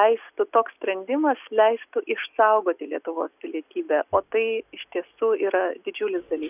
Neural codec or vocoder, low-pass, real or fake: none; 3.6 kHz; real